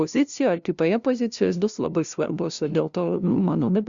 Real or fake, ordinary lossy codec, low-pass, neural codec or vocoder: fake; Opus, 64 kbps; 7.2 kHz; codec, 16 kHz, 0.5 kbps, FunCodec, trained on LibriTTS, 25 frames a second